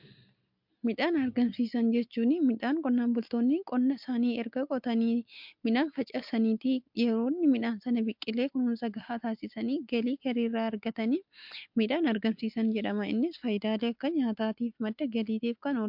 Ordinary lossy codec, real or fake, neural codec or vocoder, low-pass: MP3, 48 kbps; real; none; 5.4 kHz